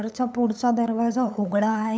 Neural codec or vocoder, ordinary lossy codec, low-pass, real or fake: codec, 16 kHz, 8 kbps, FunCodec, trained on LibriTTS, 25 frames a second; none; none; fake